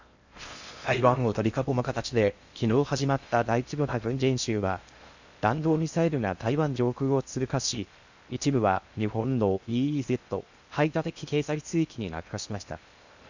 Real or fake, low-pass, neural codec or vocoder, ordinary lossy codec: fake; 7.2 kHz; codec, 16 kHz in and 24 kHz out, 0.6 kbps, FocalCodec, streaming, 4096 codes; Opus, 64 kbps